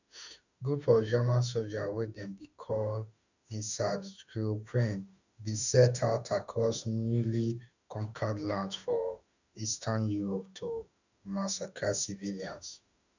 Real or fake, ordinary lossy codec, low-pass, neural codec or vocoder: fake; none; 7.2 kHz; autoencoder, 48 kHz, 32 numbers a frame, DAC-VAE, trained on Japanese speech